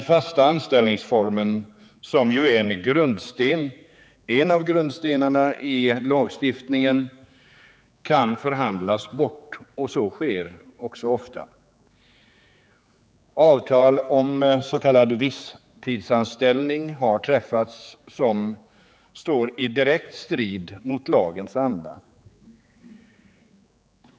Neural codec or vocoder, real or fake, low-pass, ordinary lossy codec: codec, 16 kHz, 4 kbps, X-Codec, HuBERT features, trained on general audio; fake; none; none